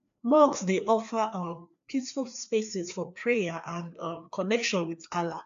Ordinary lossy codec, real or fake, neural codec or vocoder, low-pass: AAC, 64 kbps; fake; codec, 16 kHz, 2 kbps, FreqCodec, larger model; 7.2 kHz